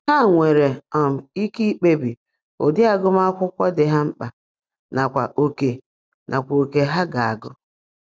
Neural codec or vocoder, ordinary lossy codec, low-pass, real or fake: none; none; none; real